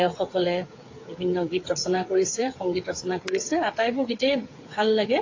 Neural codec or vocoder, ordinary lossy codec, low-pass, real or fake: codec, 16 kHz, 8 kbps, FreqCodec, smaller model; AAC, 32 kbps; 7.2 kHz; fake